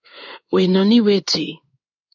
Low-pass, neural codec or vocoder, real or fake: 7.2 kHz; codec, 16 kHz in and 24 kHz out, 1 kbps, XY-Tokenizer; fake